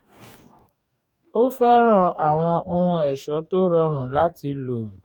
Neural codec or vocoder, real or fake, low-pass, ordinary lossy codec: codec, 44.1 kHz, 2.6 kbps, DAC; fake; 19.8 kHz; none